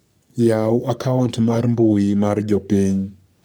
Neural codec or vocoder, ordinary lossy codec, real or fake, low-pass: codec, 44.1 kHz, 3.4 kbps, Pupu-Codec; none; fake; none